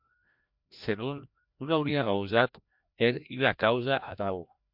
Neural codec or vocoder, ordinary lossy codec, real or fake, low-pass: codec, 16 kHz, 1 kbps, FreqCodec, larger model; MP3, 48 kbps; fake; 5.4 kHz